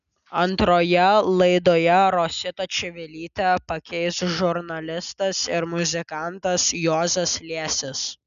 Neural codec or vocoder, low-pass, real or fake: none; 7.2 kHz; real